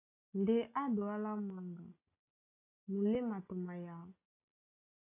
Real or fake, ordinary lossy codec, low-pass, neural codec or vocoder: real; AAC, 32 kbps; 3.6 kHz; none